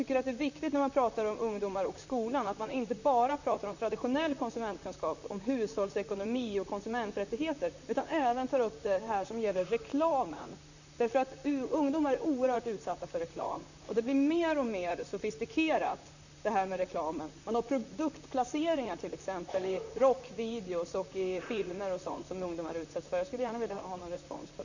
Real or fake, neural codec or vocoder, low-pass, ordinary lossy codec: fake; vocoder, 44.1 kHz, 128 mel bands, Pupu-Vocoder; 7.2 kHz; none